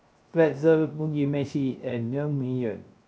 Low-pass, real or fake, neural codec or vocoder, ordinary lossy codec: none; fake; codec, 16 kHz, 0.3 kbps, FocalCodec; none